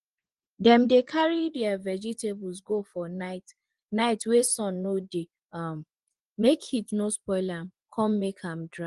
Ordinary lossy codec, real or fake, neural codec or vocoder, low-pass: Opus, 24 kbps; fake; vocoder, 24 kHz, 100 mel bands, Vocos; 10.8 kHz